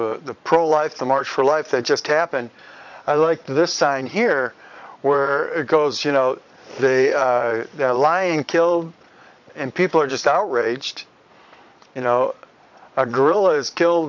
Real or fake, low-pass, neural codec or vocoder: fake; 7.2 kHz; vocoder, 22.05 kHz, 80 mel bands, Vocos